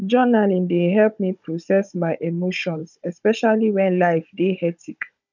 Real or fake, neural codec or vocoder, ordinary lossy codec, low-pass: fake; codec, 16 kHz, 16 kbps, FunCodec, trained on Chinese and English, 50 frames a second; none; 7.2 kHz